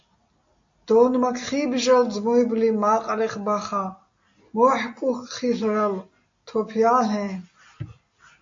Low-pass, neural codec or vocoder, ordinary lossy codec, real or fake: 7.2 kHz; none; AAC, 48 kbps; real